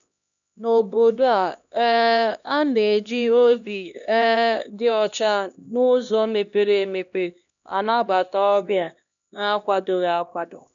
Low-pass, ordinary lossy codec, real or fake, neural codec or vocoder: 7.2 kHz; none; fake; codec, 16 kHz, 1 kbps, X-Codec, HuBERT features, trained on LibriSpeech